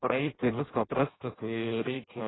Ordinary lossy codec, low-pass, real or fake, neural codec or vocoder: AAC, 16 kbps; 7.2 kHz; fake; codec, 16 kHz in and 24 kHz out, 0.6 kbps, FireRedTTS-2 codec